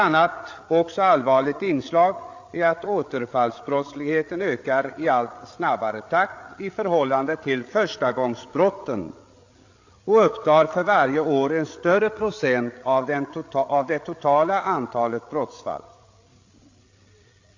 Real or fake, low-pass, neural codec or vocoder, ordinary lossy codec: fake; 7.2 kHz; codec, 16 kHz, 8 kbps, FreqCodec, larger model; AAC, 48 kbps